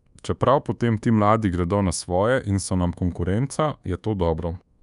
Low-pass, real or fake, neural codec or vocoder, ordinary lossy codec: 10.8 kHz; fake; codec, 24 kHz, 1.2 kbps, DualCodec; none